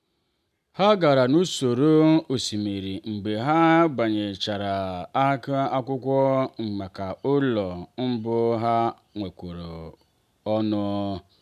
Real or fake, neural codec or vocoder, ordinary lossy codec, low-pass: real; none; none; 14.4 kHz